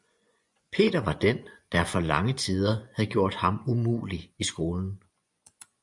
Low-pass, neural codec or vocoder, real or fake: 10.8 kHz; vocoder, 24 kHz, 100 mel bands, Vocos; fake